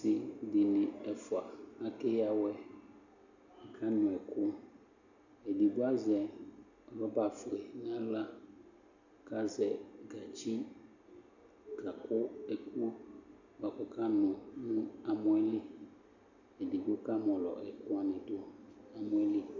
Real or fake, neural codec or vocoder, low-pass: real; none; 7.2 kHz